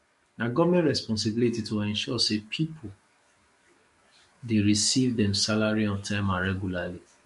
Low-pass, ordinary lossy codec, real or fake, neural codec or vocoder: 14.4 kHz; MP3, 48 kbps; fake; codec, 44.1 kHz, 7.8 kbps, DAC